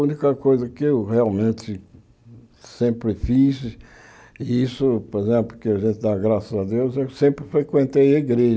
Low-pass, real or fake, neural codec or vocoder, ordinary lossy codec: none; real; none; none